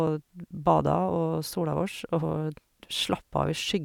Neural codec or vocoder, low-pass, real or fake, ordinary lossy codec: none; 19.8 kHz; real; none